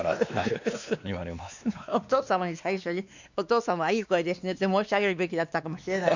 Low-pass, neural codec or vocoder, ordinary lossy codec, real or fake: 7.2 kHz; codec, 16 kHz, 2 kbps, X-Codec, WavLM features, trained on Multilingual LibriSpeech; none; fake